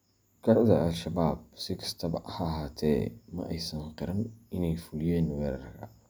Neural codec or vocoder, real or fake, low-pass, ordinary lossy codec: none; real; none; none